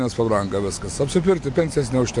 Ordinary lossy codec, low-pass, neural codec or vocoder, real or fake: AAC, 64 kbps; 10.8 kHz; none; real